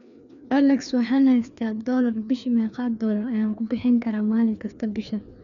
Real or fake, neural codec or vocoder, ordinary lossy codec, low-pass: fake; codec, 16 kHz, 2 kbps, FreqCodec, larger model; none; 7.2 kHz